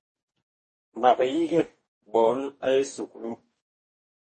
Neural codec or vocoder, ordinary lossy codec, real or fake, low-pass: codec, 44.1 kHz, 2.6 kbps, DAC; MP3, 32 kbps; fake; 10.8 kHz